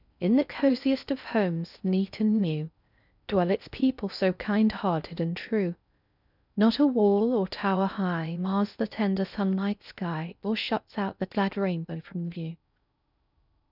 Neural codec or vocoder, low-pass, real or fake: codec, 16 kHz in and 24 kHz out, 0.6 kbps, FocalCodec, streaming, 4096 codes; 5.4 kHz; fake